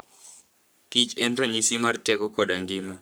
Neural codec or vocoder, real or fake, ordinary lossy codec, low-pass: codec, 44.1 kHz, 3.4 kbps, Pupu-Codec; fake; none; none